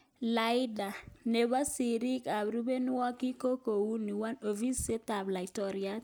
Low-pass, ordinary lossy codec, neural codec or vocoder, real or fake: none; none; none; real